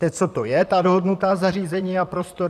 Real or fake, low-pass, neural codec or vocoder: fake; 14.4 kHz; vocoder, 44.1 kHz, 128 mel bands, Pupu-Vocoder